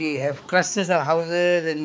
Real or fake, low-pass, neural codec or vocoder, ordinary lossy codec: fake; none; codec, 16 kHz, 4 kbps, X-Codec, HuBERT features, trained on balanced general audio; none